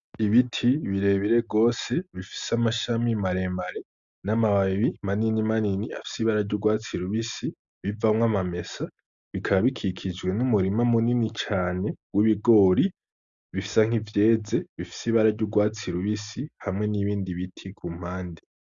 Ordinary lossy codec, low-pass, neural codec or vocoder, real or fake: Opus, 64 kbps; 7.2 kHz; none; real